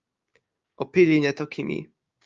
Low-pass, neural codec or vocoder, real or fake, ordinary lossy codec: 7.2 kHz; codec, 16 kHz, 6 kbps, DAC; fake; Opus, 32 kbps